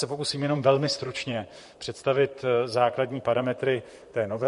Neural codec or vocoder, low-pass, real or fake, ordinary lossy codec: vocoder, 44.1 kHz, 128 mel bands, Pupu-Vocoder; 14.4 kHz; fake; MP3, 48 kbps